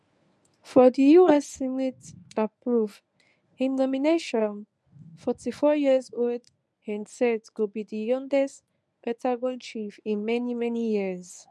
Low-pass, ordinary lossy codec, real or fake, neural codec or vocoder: none; none; fake; codec, 24 kHz, 0.9 kbps, WavTokenizer, medium speech release version 1